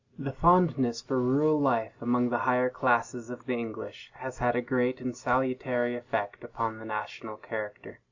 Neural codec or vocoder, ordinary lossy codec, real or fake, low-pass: none; Opus, 64 kbps; real; 7.2 kHz